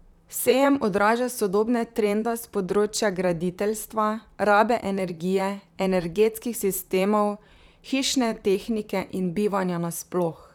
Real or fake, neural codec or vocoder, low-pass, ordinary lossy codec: fake; vocoder, 44.1 kHz, 128 mel bands, Pupu-Vocoder; 19.8 kHz; none